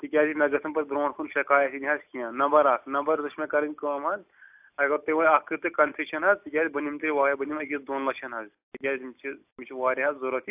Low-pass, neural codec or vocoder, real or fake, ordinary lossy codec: 3.6 kHz; none; real; AAC, 32 kbps